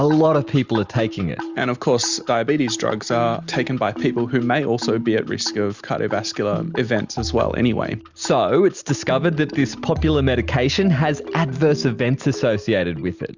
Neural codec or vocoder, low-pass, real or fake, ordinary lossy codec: none; 7.2 kHz; real; Opus, 64 kbps